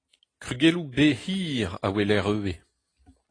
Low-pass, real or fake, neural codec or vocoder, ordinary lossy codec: 9.9 kHz; real; none; AAC, 32 kbps